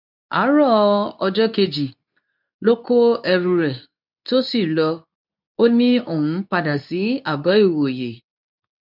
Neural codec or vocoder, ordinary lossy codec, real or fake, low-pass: codec, 24 kHz, 0.9 kbps, WavTokenizer, medium speech release version 2; none; fake; 5.4 kHz